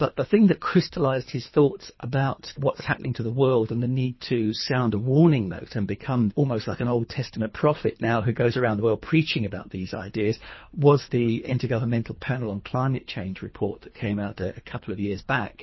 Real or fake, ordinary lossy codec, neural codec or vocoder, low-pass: fake; MP3, 24 kbps; codec, 24 kHz, 3 kbps, HILCodec; 7.2 kHz